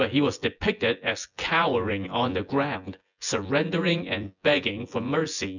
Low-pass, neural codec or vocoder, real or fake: 7.2 kHz; vocoder, 24 kHz, 100 mel bands, Vocos; fake